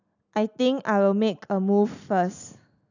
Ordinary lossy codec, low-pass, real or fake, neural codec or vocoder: none; 7.2 kHz; real; none